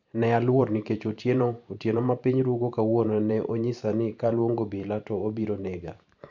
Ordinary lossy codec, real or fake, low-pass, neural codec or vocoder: none; fake; 7.2 kHz; vocoder, 44.1 kHz, 128 mel bands every 512 samples, BigVGAN v2